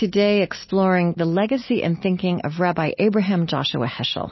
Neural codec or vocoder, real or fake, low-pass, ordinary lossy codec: none; real; 7.2 kHz; MP3, 24 kbps